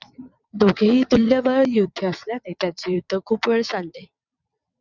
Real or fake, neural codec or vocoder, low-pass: fake; vocoder, 22.05 kHz, 80 mel bands, WaveNeXt; 7.2 kHz